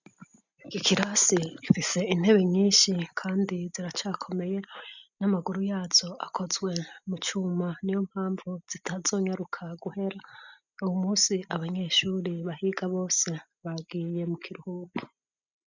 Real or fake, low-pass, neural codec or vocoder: real; 7.2 kHz; none